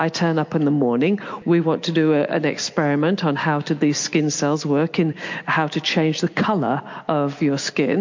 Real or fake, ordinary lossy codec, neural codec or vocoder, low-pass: real; MP3, 48 kbps; none; 7.2 kHz